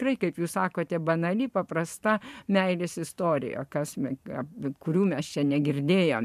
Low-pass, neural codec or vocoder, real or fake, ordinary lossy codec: 14.4 kHz; vocoder, 44.1 kHz, 128 mel bands every 512 samples, BigVGAN v2; fake; MP3, 96 kbps